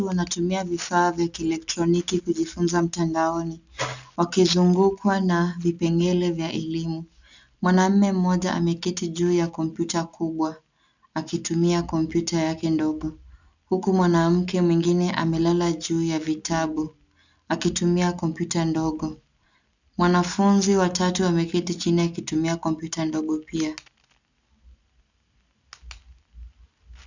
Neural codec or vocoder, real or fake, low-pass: none; real; 7.2 kHz